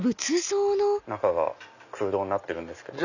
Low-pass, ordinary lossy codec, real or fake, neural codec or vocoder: 7.2 kHz; none; real; none